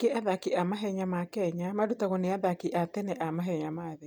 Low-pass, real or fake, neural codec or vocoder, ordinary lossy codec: none; real; none; none